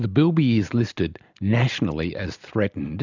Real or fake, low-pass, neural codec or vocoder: real; 7.2 kHz; none